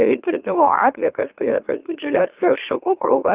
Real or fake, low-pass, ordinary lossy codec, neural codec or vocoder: fake; 3.6 kHz; Opus, 32 kbps; autoencoder, 44.1 kHz, a latent of 192 numbers a frame, MeloTTS